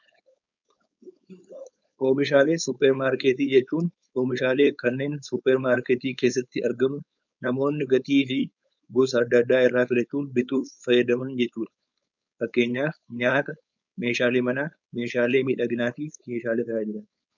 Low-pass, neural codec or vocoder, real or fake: 7.2 kHz; codec, 16 kHz, 4.8 kbps, FACodec; fake